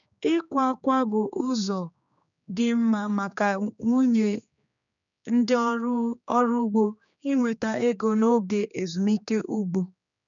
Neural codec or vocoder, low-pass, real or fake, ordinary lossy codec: codec, 16 kHz, 2 kbps, X-Codec, HuBERT features, trained on general audio; 7.2 kHz; fake; none